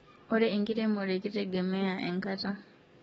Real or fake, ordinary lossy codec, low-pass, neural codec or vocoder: fake; AAC, 24 kbps; 19.8 kHz; codec, 44.1 kHz, 7.8 kbps, DAC